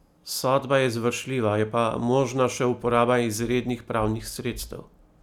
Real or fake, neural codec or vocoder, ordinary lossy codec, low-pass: real; none; none; 19.8 kHz